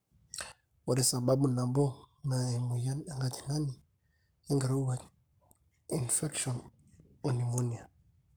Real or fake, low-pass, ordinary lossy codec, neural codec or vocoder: fake; none; none; codec, 44.1 kHz, 7.8 kbps, Pupu-Codec